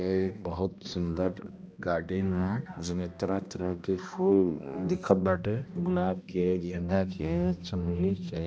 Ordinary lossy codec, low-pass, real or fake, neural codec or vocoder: none; none; fake; codec, 16 kHz, 1 kbps, X-Codec, HuBERT features, trained on balanced general audio